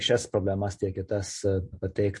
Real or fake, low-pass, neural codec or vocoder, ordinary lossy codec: real; 10.8 kHz; none; MP3, 48 kbps